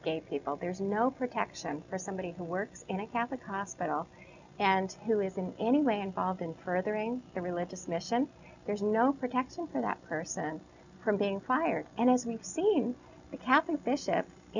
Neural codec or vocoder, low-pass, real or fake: none; 7.2 kHz; real